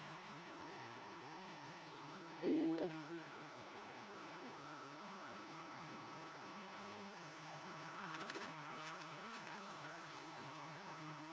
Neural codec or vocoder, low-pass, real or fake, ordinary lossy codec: codec, 16 kHz, 1 kbps, FunCodec, trained on LibriTTS, 50 frames a second; none; fake; none